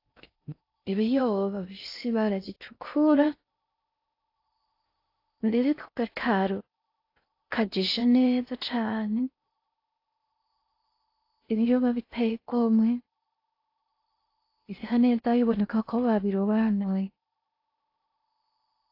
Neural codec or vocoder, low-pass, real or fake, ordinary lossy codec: codec, 16 kHz in and 24 kHz out, 0.6 kbps, FocalCodec, streaming, 4096 codes; 5.4 kHz; fake; AAC, 32 kbps